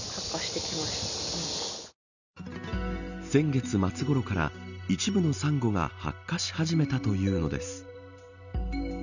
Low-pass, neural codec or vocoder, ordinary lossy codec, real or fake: 7.2 kHz; none; none; real